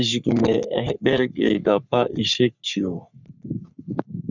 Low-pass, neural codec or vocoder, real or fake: 7.2 kHz; codec, 44.1 kHz, 3.4 kbps, Pupu-Codec; fake